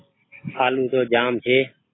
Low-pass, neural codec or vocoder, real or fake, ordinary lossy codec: 7.2 kHz; none; real; AAC, 16 kbps